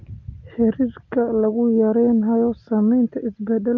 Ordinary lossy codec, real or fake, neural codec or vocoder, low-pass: Opus, 24 kbps; real; none; 7.2 kHz